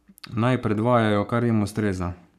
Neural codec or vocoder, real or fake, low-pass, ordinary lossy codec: codec, 44.1 kHz, 7.8 kbps, Pupu-Codec; fake; 14.4 kHz; none